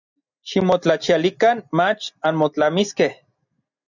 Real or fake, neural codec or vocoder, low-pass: real; none; 7.2 kHz